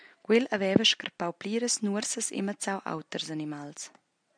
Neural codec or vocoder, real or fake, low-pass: none; real; 9.9 kHz